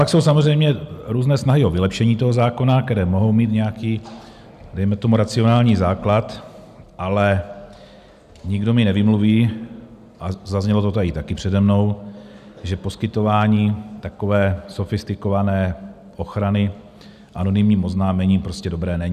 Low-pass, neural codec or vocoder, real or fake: 14.4 kHz; none; real